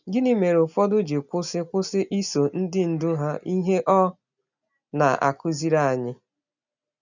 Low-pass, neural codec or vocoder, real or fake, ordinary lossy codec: 7.2 kHz; none; real; none